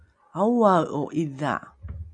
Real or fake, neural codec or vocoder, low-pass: real; none; 9.9 kHz